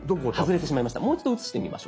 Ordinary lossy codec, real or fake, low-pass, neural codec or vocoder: none; real; none; none